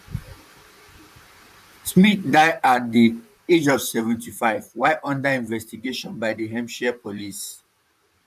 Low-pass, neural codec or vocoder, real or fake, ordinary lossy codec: 14.4 kHz; vocoder, 44.1 kHz, 128 mel bands, Pupu-Vocoder; fake; none